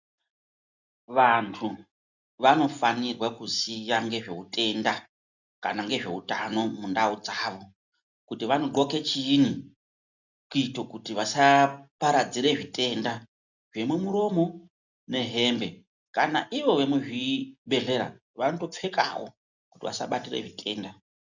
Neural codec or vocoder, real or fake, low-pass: none; real; 7.2 kHz